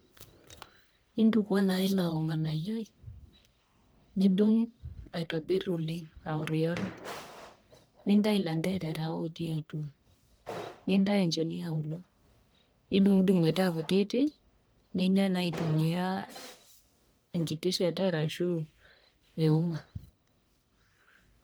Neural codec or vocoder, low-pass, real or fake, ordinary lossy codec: codec, 44.1 kHz, 1.7 kbps, Pupu-Codec; none; fake; none